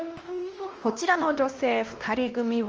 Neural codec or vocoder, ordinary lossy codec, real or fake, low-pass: codec, 16 kHz, 1 kbps, X-Codec, WavLM features, trained on Multilingual LibriSpeech; Opus, 24 kbps; fake; 7.2 kHz